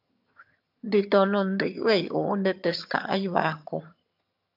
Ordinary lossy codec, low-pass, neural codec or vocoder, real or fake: AAC, 48 kbps; 5.4 kHz; vocoder, 22.05 kHz, 80 mel bands, HiFi-GAN; fake